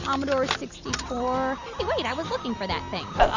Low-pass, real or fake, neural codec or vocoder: 7.2 kHz; real; none